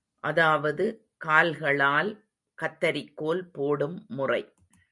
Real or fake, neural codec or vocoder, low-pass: real; none; 10.8 kHz